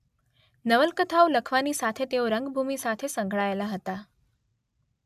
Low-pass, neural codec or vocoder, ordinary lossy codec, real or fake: 14.4 kHz; none; AAC, 96 kbps; real